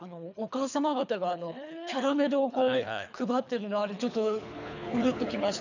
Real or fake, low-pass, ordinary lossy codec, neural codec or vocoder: fake; 7.2 kHz; none; codec, 24 kHz, 3 kbps, HILCodec